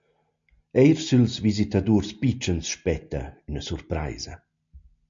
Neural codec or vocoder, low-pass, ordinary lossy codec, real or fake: none; 7.2 kHz; MP3, 48 kbps; real